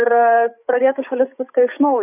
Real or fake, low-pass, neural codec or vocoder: fake; 3.6 kHz; codec, 16 kHz, 8 kbps, FreqCodec, larger model